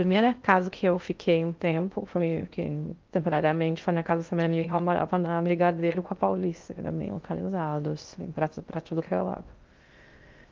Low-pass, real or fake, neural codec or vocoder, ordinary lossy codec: 7.2 kHz; fake; codec, 16 kHz in and 24 kHz out, 0.6 kbps, FocalCodec, streaming, 2048 codes; Opus, 24 kbps